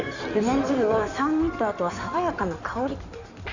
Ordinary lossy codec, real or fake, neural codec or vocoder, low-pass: none; fake; codec, 16 kHz in and 24 kHz out, 2.2 kbps, FireRedTTS-2 codec; 7.2 kHz